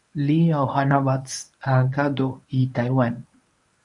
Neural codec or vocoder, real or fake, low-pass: codec, 24 kHz, 0.9 kbps, WavTokenizer, medium speech release version 1; fake; 10.8 kHz